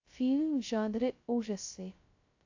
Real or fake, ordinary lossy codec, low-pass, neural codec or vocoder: fake; AAC, 48 kbps; 7.2 kHz; codec, 16 kHz, 0.2 kbps, FocalCodec